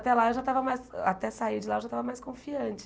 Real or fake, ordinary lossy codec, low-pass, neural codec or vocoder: real; none; none; none